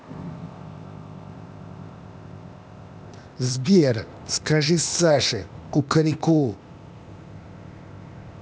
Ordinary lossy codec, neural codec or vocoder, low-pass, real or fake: none; codec, 16 kHz, 0.8 kbps, ZipCodec; none; fake